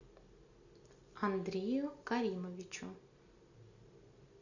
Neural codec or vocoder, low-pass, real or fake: none; 7.2 kHz; real